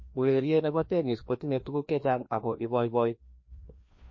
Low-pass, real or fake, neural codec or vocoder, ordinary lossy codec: 7.2 kHz; fake; codec, 16 kHz, 1 kbps, FreqCodec, larger model; MP3, 32 kbps